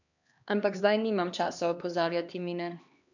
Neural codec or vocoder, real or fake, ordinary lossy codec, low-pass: codec, 16 kHz, 2 kbps, X-Codec, HuBERT features, trained on LibriSpeech; fake; none; 7.2 kHz